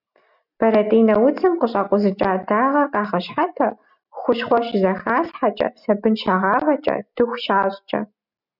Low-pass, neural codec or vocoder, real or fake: 5.4 kHz; none; real